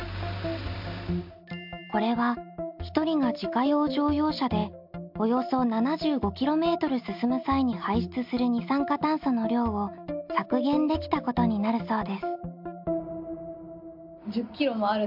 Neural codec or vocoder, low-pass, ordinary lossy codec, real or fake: none; 5.4 kHz; MP3, 48 kbps; real